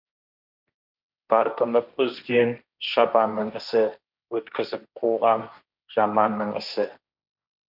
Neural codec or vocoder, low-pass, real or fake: codec, 16 kHz, 1.1 kbps, Voila-Tokenizer; 5.4 kHz; fake